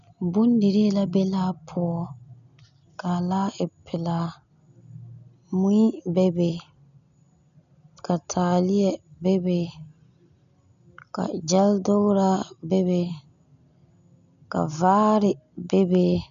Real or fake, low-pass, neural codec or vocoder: real; 7.2 kHz; none